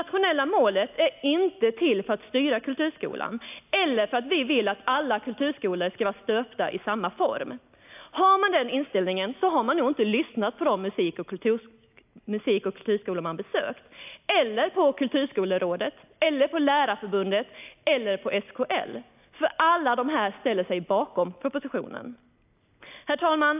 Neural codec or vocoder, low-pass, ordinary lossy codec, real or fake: none; 3.6 kHz; AAC, 32 kbps; real